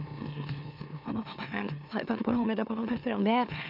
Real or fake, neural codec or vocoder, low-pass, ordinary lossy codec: fake; autoencoder, 44.1 kHz, a latent of 192 numbers a frame, MeloTTS; 5.4 kHz; none